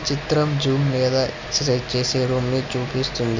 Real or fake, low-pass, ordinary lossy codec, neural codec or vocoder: real; 7.2 kHz; MP3, 64 kbps; none